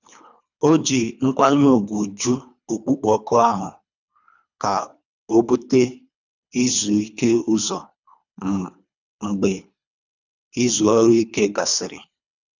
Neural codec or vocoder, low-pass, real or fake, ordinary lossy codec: codec, 24 kHz, 3 kbps, HILCodec; 7.2 kHz; fake; none